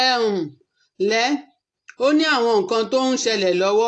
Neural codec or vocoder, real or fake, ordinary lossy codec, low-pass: none; real; AAC, 48 kbps; 9.9 kHz